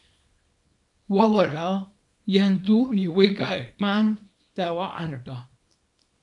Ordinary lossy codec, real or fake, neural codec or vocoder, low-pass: MP3, 64 kbps; fake; codec, 24 kHz, 0.9 kbps, WavTokenizer, small release; 10.8 kHz